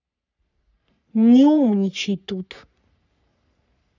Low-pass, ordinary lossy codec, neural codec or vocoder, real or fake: 7.2 kHz; none; codec, 44.1 kHz, 3.4 kbps, Pupu-Codec; fake